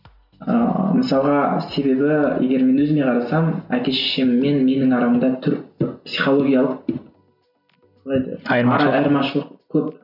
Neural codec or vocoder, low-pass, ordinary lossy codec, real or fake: none; 5.4 kHz; none; real